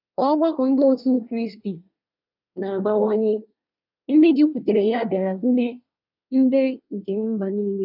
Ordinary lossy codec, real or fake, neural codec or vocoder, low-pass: none; fake; codec, 24 kHz, 1 kbps, SNAC; 5.4 kHz